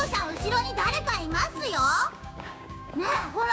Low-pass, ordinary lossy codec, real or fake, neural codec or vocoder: none; none; fake; codec, 16 kHz, 6 kbps, DAC